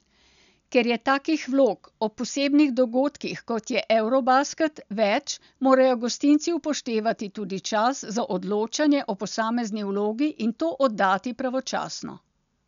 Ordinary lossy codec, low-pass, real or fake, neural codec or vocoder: none; 7.2 kHz; real; none